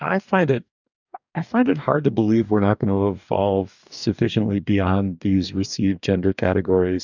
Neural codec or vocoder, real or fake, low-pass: codec, 44.1 kHz, 2.6 kbps, DAC; fake; 7.2 kHz